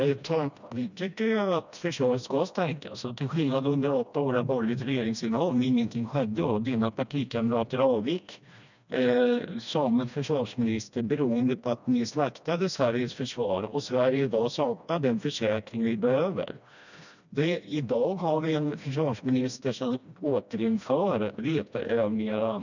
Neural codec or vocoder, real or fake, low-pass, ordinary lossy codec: codec, 16 kHz, 1 kbps, FreqCodec, smaller model; fake; 7.2 kHz; none